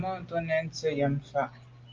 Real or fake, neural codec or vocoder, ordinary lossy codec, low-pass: real; none; Opus, 24 kbps; 7.2 kHz